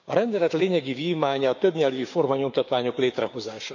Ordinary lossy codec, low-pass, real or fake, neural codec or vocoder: none; 7.2 kHz; fake; codec, 16 kHz, 6 kbps, DAC